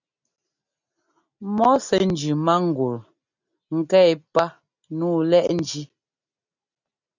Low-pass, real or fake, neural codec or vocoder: 7.2 kHz; real; none